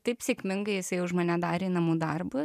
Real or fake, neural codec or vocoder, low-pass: fake; vocoder, 44.1 kHz, 128 mel bands every 512 samples, BigVGAN v2; 14.4 kHz